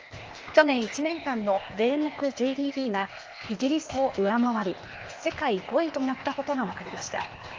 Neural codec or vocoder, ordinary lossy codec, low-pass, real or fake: codec, 16 kHz, 0.8 kbps, ZipCodec; Opus, 24 kbps; 7.2 kHz; fake